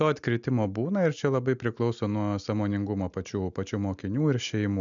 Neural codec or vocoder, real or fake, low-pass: none; real; 7.2 kHz